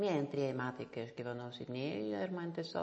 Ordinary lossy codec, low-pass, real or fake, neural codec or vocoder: MP3, 32 kbps; 9.9 kHz; real; none